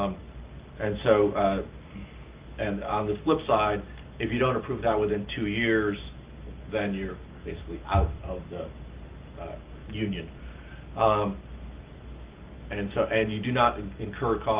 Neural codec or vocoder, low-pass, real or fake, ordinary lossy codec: none; 3.6 kHz; real; Opus, 32 kbps